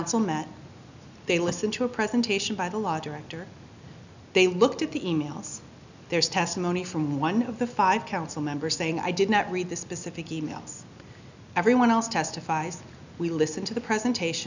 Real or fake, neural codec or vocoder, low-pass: real; none; 7.2 kHz